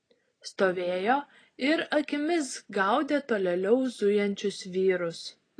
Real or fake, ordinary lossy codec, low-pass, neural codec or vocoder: real; AAC, 32 kbps; 9.9 kHz; none